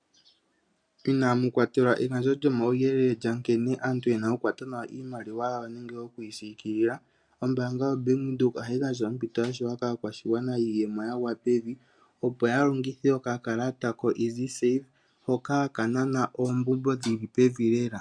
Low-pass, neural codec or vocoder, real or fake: 9.9 kHz; none; real